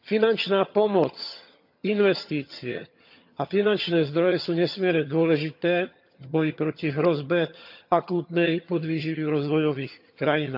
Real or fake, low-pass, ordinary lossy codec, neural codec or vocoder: fake; 5.4 kHz; none; vocoder, 22.05 kHz, 80 mel bands, HiFi-GAN